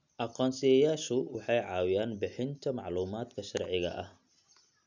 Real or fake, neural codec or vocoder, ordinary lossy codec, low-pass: real; none; none; 7.2 kHz